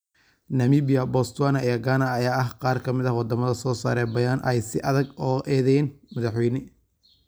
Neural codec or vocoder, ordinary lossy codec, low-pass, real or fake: none; none; none; real